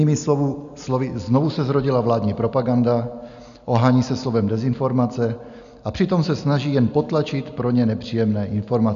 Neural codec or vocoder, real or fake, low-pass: none; real; 7.2 kHz